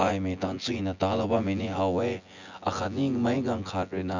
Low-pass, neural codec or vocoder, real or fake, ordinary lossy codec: 7.2 kHz; vocoder, 24 kHz, 100 mel bands, Vocos; fake; none